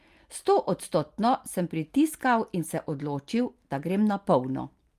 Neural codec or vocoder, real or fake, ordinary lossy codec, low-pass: none; real; Opus, 32 kbps; 14.4 kHz